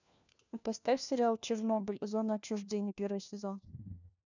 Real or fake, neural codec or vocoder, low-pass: fake; codec, 16 kHz, 1 kbps, FunCodec, trained on LibriTTS, 50 frames a second; 7.2 kHz